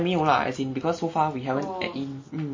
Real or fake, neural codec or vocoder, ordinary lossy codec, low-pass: real; none; MP3, 32 kbps; 7.2 kHz